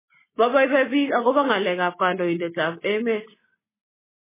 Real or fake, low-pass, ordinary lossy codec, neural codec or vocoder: real; 3.6 kHz; MP3, 16 kbps; none